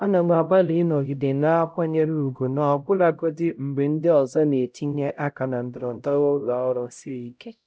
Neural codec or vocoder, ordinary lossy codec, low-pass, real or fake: codec, 16 kHz, 0.5 kbps, X-Codec, HuBERT features, trained on LibriSpeech; none; none; fake